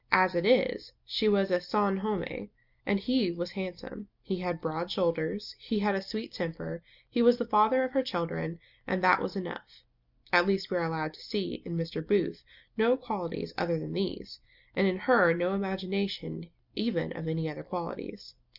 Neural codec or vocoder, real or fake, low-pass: none; real; 5.4 kHz